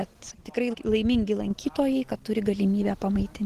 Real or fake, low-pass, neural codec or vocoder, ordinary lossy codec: real; 14.4 kHz; none; Opus, 16 kbps